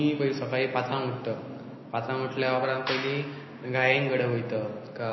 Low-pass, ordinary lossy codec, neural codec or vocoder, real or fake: 7.2 kHz; MP3, 24 kbps; none; real